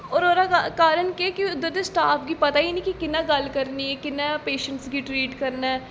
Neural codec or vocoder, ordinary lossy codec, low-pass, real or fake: none; none; none; real